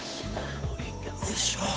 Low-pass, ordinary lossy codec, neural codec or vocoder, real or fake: none; none; codec, 16 kHz, 8 kbps, FunCodec, trained on Chinese and English, 25 frames a second; fake